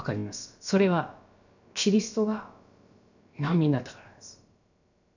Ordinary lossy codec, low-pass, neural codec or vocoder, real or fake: none; 7.2 kHz; codec, 16 kHz, about 1 kbps, DyCAST, with the encoder's durations; fake